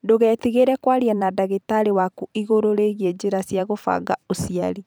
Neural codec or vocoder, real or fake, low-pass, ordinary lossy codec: vocoder, 44.1 kHz, 128 mel bands every 512 samples, BigVGAN v2; fake; none; none